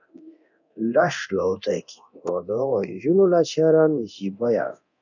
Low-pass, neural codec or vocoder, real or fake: 7.2 kHz; codec, 24 kHz, 0.9 kbps, DualCodec; fake